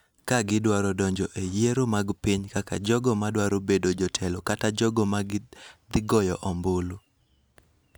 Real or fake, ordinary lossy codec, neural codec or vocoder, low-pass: real; none; none; none